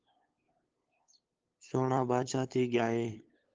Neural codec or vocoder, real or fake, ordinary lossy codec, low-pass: codec, 16 kHz, 8 kbps, FunCodec, trained on LibriTTS, 25 frames a second; fake; Opus, 16 kbps; 7.2 kHz